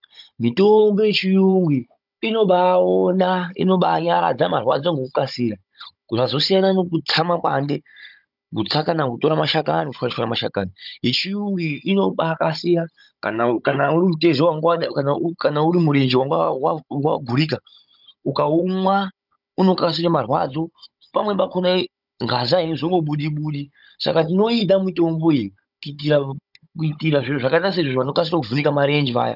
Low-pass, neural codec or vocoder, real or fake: 5.4 kHz; codec, 16 kHz, 16 kbps, FunCodec, trained on Chinese and English, 50 frames a second; fake